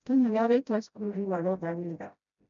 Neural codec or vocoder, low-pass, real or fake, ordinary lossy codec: codec, 16 kHz, 0.5 kbps, FreqCodec, smaller model; 7.2 kHz; fake; MP3, 96 kbps